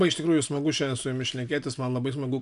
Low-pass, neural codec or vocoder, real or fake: 10.8 kHz; none; real